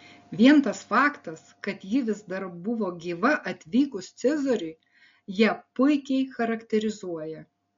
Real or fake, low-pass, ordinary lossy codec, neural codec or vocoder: real; 7.2 kHz; MP3, 48 kbps; none